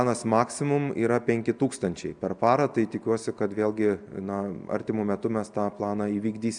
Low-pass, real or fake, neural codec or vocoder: 9.9 kHz; real; none